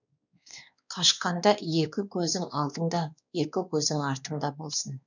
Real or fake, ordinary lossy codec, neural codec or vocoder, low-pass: fake; none; codec, 16 kHz, 2 kbps, X-Codec, WavLM features, trained on Multilingual LibriSpeech; 7.2 kHz